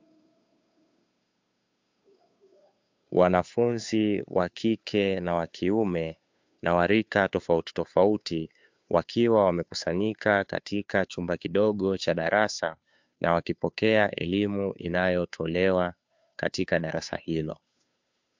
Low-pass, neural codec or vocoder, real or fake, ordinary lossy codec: 7.2 kHz; codec, 16 kHz, 2 kbps, FunCodec, trained on Chinese and English, 25 frames a second; fake; MP3, 64 kbps